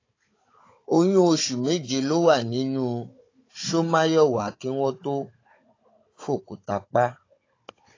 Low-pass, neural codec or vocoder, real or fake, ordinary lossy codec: 7.2 kHz; codec, 16 kHz, 16 kbps, FunCodec, trained on Chinese and English, 50 frames a second; fake; AAC, 32 kbps